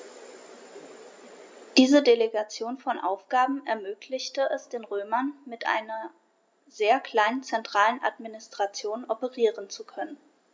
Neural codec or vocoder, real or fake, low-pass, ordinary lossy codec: none; real; none; none